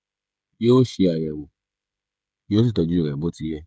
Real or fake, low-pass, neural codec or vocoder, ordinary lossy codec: fake; none; codec, 16 kHz, 16 kbps, FreqCodec, smaller model; none